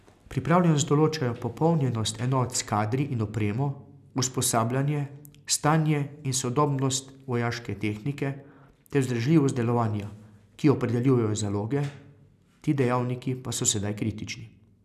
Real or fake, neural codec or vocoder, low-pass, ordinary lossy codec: real; none; 14.4 kHz; none